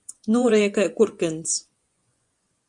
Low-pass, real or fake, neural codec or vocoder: 10.8 kHz; fake; vocoder, 24 kHz, 100 mel bands, Vocos